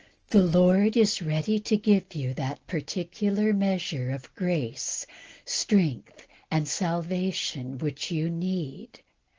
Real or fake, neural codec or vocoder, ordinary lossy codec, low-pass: real; none; Opus, 16 kbps; 7.2 kHz